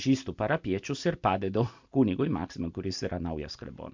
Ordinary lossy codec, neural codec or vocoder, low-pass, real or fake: MP3, 64 kbps; none; 7.2 kHz; real